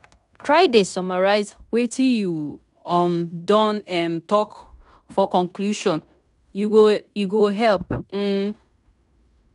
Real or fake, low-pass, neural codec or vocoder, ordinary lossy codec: fake; 10.8 kHz; codec, 16 kHz in and 24 kHz out, 0.9 kbps, LongCat-Audio-Codec, fine tuned four codebook decoder; none